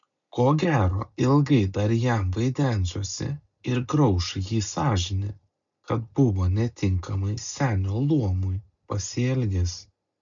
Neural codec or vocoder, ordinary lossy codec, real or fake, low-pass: none; MP3, 96 kbps; real; 7.2 kHz